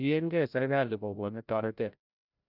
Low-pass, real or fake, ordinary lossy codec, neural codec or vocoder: 5.4 kHz; fake; none; codec, 16 kHz, 0.5 kbps, FreqCodec, larger model